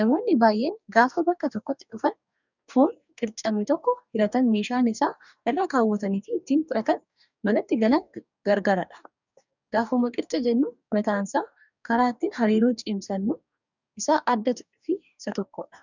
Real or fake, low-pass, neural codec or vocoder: fake; 7.2 kHz; codec, 44.1 kHz, 2.6 kbps, DAC